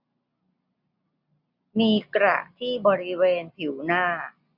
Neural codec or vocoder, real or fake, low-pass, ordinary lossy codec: none; real; 5.4 kHz; none